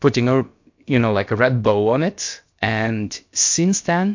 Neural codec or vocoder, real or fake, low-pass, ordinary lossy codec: codec, 16 kHz, about 1 kbps, DyCAST, with the encoder's durations; fake; 7.2 kHz; MP3, 48 kbps